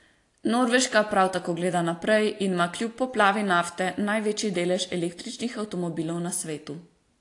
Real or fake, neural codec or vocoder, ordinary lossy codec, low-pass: real; none; AAC, 48 kbps; 10.8 kHz